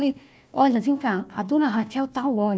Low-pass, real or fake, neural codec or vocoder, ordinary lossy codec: none; fake; codec, 16 kHz, 1 kbps, FunCodec, trained on Chinese and English, 50 frames a second; none